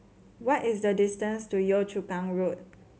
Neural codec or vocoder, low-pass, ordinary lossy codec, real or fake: none; none; none; real